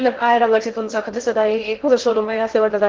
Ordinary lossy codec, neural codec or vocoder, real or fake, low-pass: Opus, 16 kbps; codec, 16 kHz in and 24 kHz out, 0.6 kbps, FocalCodec, streaming, 2048 codes; fake; 7.2 kHz